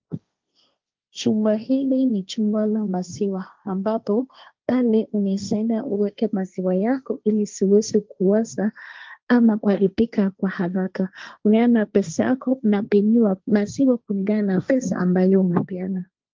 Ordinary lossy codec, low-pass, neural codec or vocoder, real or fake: Opus, 24 kbps; 7.2 kHz; codec, 16 kHz, 1.1 kbps, Voila-Tokenizer; fake